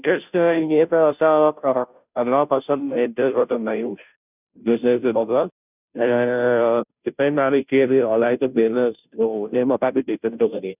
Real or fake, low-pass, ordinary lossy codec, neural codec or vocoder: fake; 3.6 kHz; none; codec, 16 kHz, 0.5 kbps, FunCodec, trained on Chinese and English, 25 frames a second